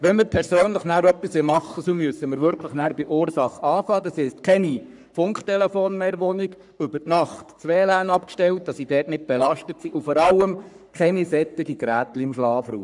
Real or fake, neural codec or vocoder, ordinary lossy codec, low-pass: fake; codec, 44.1 kHz, 3.4 kbps, Pupu-Codec; none; 10.8 kHz